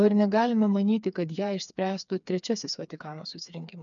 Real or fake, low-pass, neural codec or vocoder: fake; 7.2 kHz; codec, 16 kHz, 4 kbps, FreqCodec, smaller model